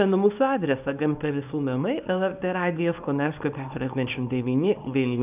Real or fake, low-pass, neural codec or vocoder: fake; 3.6 kHz; codec, 24 kHz, 0.9 kbps, WavTokenizer, small release